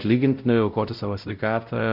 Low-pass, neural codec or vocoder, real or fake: 5.4 kHz; codec, 16 kHz, 0.5 kbps, X-Codec, WavLM features, trained on Multilingual LibriSpeech; fake